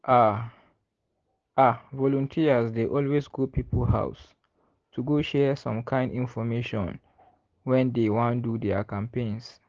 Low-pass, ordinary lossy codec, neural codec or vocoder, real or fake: 7.2 kHz; Opus, 16 kbps; none; real